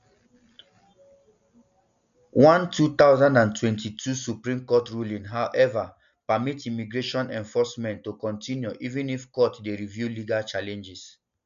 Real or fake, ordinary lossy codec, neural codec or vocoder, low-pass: real; none; none; 7.2 kHz